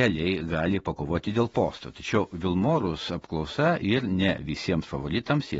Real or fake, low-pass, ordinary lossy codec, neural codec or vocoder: fake; 19.8 kHz; AAC, 24 kbps; vocoder, 48 kHz, 128 mel bands, Vocos